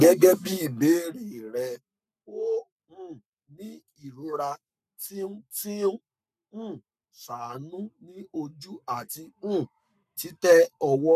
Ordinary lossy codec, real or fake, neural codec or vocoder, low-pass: none; fake; codec, 44.1 kHz, 7.8 kbps, Pupu-Codec; 14.4 kHz